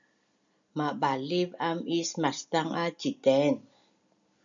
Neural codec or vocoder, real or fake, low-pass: none; real; 7.2 kHz